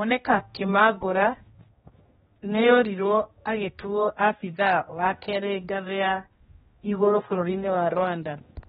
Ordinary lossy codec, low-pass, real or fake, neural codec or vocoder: AAC, 16 kbps; 7.2 kHz; fake; codec, 16 kHz, 1 kbps, X-Codec, HuBERT features, trained on general audio